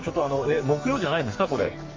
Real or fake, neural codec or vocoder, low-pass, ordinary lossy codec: fake; codec, 44.1 kHz, 2.6 kbps, SNAC; 7.2 kHz; Opus, 32 kbps